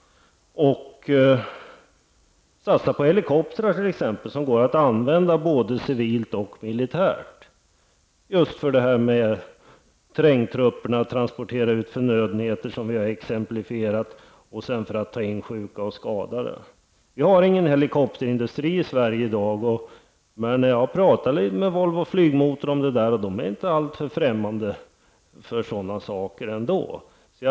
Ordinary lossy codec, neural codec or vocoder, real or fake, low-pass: none; none; real; none